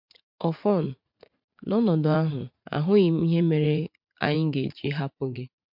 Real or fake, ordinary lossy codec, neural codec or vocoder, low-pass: fake; MP3, 48 kbps; vocoder, 44.1 kHz, 80 mel bands, Vocos; 5.4 kHz